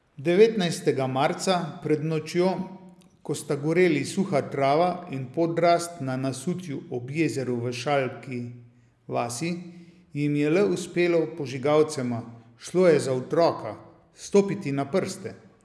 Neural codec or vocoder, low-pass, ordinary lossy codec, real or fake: none; none; none; real